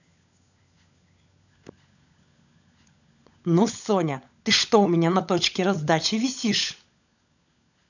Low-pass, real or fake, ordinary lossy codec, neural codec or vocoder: 7.2 kHz; fake; none; codec, 16 kHz, 16 kbps, FunCodec, trained on LibriTTS, 50 frames a second